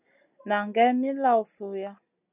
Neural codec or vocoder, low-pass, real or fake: none; 3.6 kHz; real